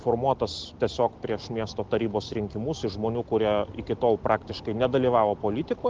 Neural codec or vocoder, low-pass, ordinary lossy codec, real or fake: none; 7.2 kHz; Opus, 16 kbps; real